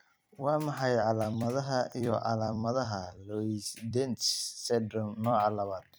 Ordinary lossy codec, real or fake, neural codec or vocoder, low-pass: none; fake; vocoder, 44.1 kHz, 128 mel bands every 256 samples, BigVGAN v2; none